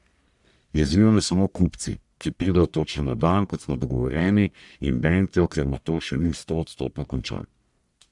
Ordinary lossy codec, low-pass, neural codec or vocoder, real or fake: none; 10.8 kHz; codec, 44.1 kHz, 1.7 kbps, Pupu-Codec; fake